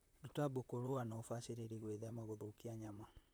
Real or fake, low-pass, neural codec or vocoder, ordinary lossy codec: fake; none; vocoder, 44.1 kHz, 128 mel bands, Pupu-Vocoder; none